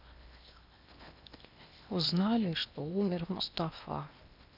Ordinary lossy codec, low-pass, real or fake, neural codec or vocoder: none; 5.4 kHz; fake; codec, 16 kHz in and 24 kHz out, 0.8 kbps, FocalCodec, streaming, 65536 codes